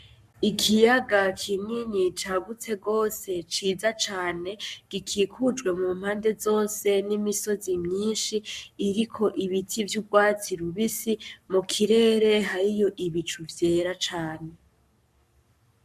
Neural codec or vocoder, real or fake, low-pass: codec, 44.1 kHz, 7.8 kbps, Pupu-Codec; fake; 14.4 kHz